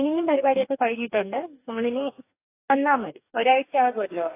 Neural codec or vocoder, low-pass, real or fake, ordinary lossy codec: codec, 44.1 kHz, 2.6 kbps, DAC; 3.6 kHz; fake; AAC, 24 kbps